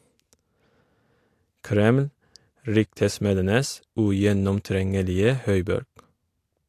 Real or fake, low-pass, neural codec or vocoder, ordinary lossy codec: real; 14.4 kHz; none; AAC, 64 kbps